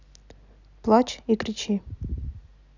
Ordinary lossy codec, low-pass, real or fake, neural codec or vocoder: none; 7.2 kHz; real; none